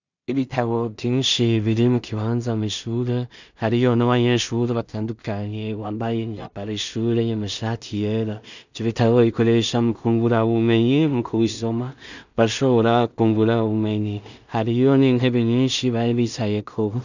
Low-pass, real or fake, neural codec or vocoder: 7.2 kHz; fake; codec, 16 kHz in and 24 kHz out, 0.4 kbps, LongCat-Audio-Codec, two codebook decoder